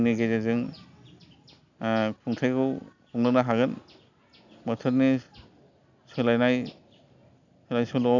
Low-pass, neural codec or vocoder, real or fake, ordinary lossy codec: 7.2 kHz; none; real; none